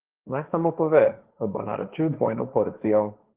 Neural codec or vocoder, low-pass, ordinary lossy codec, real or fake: codec, 16 kHz, 1.1 kbps, Voila-Tokenizer; 3.6 kHz; Opus, 16 kbps; fake